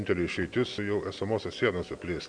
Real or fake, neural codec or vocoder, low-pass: real; none; 9.9 kHz